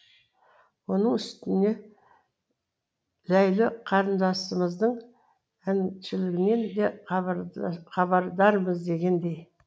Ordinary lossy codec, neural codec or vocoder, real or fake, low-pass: none; none; real; none